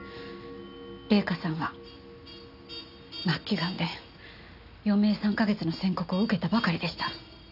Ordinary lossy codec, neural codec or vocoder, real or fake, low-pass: none; none; real; 5.4 kHz